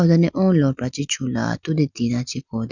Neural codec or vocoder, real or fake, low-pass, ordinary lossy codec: none; real; 7.2 kHz; none